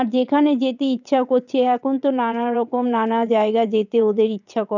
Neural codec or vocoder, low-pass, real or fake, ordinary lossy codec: vocoder, 22.05 kHz, 80 mel bands, WaveNeXt; 7.2 kHz; fake; none